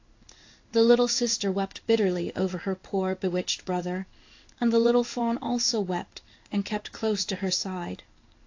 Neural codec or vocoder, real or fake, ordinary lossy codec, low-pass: codec, 16 kHz in and 24 kHz out, 1 kbps, XY-Tokenizer; fake; AAC, 48 kbps; 7.2 kHz